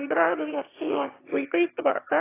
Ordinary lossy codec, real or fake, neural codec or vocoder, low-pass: AAC, 16 kbps; fake; autoencoder, 22.05 kHz, a latent of 192 numbers a frame, VITS, trained on one speaker; 3.6 kHz